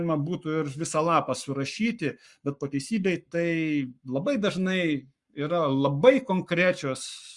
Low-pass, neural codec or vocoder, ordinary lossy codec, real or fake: 10.8 kHz; codec, 44.1 kHz, 7.8 kbps, Pupu-Codec; Opus, 64 kbps; fake